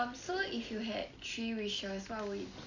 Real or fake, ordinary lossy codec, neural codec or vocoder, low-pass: real; none; none; 7.2 kHz